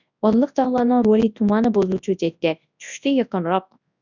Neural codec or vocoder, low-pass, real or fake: codec, 24 kHz, 0.9 kbps, WavTokenizer, large speech release; 7.2 kHz; fake